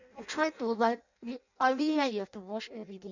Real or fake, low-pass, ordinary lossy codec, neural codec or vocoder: fake; 7.2 kHz; none; codec, 16 kHz in and 24 kHz out, 0.6 kbps, FireRedTTS-2 codec